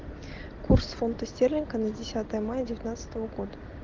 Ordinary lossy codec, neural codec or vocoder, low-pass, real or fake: Opus, 16 kbps; none; 7.2 kHz; real